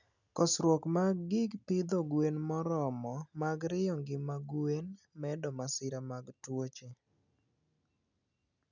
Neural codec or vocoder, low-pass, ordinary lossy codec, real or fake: none; 7.2 kHz; none; real